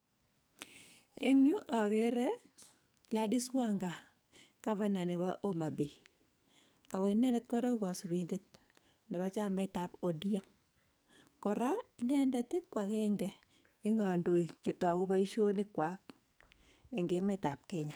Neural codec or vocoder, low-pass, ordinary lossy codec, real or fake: codec, 44.1 kHz, 2.6 kbps, SNAC; none; none; fake